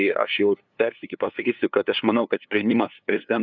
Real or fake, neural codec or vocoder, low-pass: fake; codec, 16 kHz, 2 kbps, FunCodec, trained on LibriTTS, 25 frames a second; 7.2 kHz